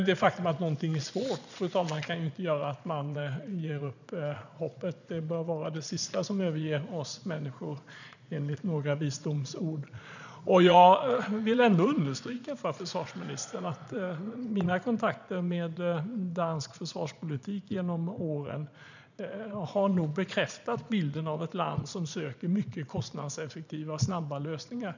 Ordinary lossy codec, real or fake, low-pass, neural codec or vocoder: none; fake; 7.2 kHz; vocoder, 22.05 kHz, 80 mel bands, Vocos